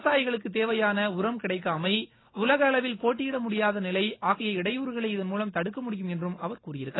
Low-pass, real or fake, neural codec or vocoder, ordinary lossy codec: 7.2 kHz; real; none; AAC, 16 kbps